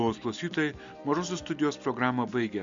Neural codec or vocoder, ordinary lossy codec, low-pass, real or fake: none; Opus, 64 kbps; 7.2 kHz; real